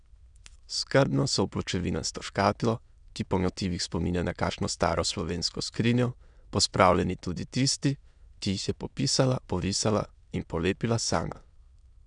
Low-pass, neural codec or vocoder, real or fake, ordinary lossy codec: 9.9 kHz; autoencoder, 22.05 kHz, a latent of 192 numbers a frame, VITS, trained on many speakers; fake; none